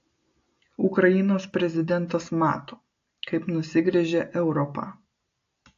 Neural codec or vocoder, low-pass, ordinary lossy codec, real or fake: none; 7.2 kHz; AAC, 48 kbps; real